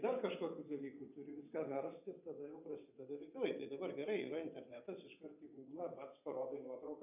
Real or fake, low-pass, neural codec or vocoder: fake; 3.6 kHz; vocoder, 22.05 kHz, 80 mel bands, WaveNeXt